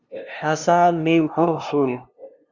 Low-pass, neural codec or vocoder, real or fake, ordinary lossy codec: 7.2 kHz; codec, 16 kHz, 0.5 kbps, FunCodec, trained on LibriTTS, 25 frames a second; fake; Opus, 64 kbps